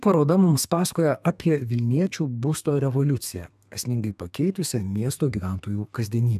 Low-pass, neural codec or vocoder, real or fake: 14.4 kHz; codec, 32 kHz, 1.9 kbps, SNAC; fake